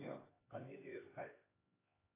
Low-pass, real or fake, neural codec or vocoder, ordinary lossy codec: 3.6 kHz; fake; codec, 16 kHz, 2 kbps, X-Codec, HuBERT features, trained on LibriSpeech; AAC, 16 kbps